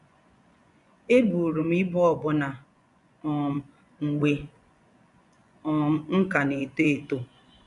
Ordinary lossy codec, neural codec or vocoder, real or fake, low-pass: none; vocoder, 24 kHz, 100 mel bands, Vocos; fake; 10.8 kHz